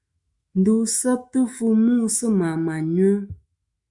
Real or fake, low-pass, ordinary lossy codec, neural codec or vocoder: fake; 10.8 kHz; Opus, 64 kbps; autoencoder, 48 kHz, 128 numbers a frame, DAC-VAE, trained on Japanese speech